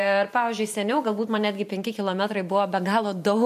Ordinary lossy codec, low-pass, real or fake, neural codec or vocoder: MP3, 64 kbps; 14.4 kHz; fake; vocoder, 44.1 kHz, 128 mel bands every 512 samples, BigVGAN v2